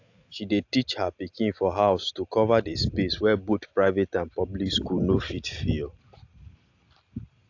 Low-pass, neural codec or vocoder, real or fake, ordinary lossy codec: 7.2 kHz; none; real; AAC, 48 kbps